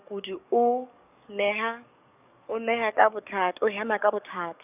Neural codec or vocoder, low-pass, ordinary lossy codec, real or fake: codec, 44.1 kHz, 7.8 kbps, DAC; 3.6 kHz; none; fake